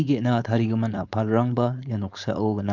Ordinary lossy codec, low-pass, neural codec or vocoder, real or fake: Opus, 64 kbps; 7.2 kHz; codec, 16 kHz, 4.8 kbps, FACodec; fake